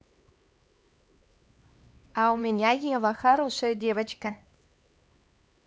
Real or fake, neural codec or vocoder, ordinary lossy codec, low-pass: fake; codec, 16 kHz, 2 kbps, X-Codec, HuBERT features, trained on LibriSpeech; none; none